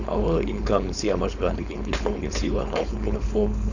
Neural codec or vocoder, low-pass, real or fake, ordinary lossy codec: codec, 16 kHz, 4.8 kbps, FACodec; 7.2 kHz; fake; none